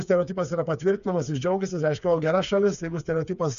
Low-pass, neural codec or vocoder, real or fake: 7.2 kHz; codec, 16 kHz, 4 kbps, FreqCodec, smaller model; fake